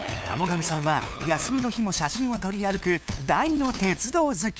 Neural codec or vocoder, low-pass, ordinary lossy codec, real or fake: codec, 16 kHz, 2 kbps, FunCodec, trained on LibriTTS, 25 frames a second; none; none; fake